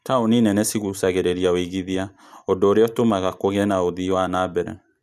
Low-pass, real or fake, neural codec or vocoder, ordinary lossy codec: 14.4 kHz; real; none; none